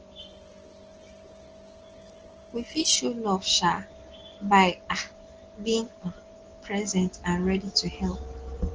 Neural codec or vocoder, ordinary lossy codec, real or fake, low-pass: none; Opus, 16 kbps; real; 7.2 kHz